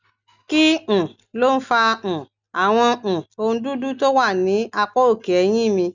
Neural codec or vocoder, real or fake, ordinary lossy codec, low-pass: none; real; none; 7.2 kHz